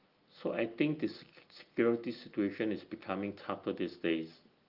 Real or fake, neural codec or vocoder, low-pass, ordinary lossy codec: real; none; 5.4 kHz; Opus, 32 kbps